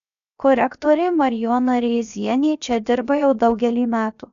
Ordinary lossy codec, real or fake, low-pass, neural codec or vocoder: AAC, 96 kbps; fake; 7.2 kHz; codec, 16 kHz, 0.7 kbps, FocalCodec